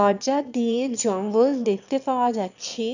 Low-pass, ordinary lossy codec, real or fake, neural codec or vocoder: 7.2 kHz; none; fake; autoencoder, 22.05 kHz, a latent of 192 numbers a frame, VITS, trained on one speaker